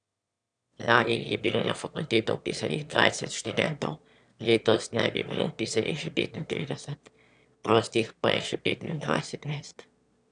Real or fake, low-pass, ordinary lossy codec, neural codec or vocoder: fake; 9.9 kHz; none; autoencoder, 22.05 kHz, a latent of 192 numbers a frame, VITS, trained on one speaker